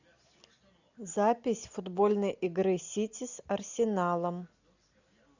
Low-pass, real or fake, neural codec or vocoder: 7.2 kHz; real; none